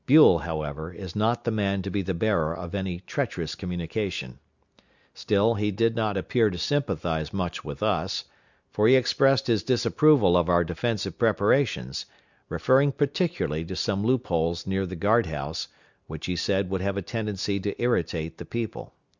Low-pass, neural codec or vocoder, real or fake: 7.2 kHz; none; real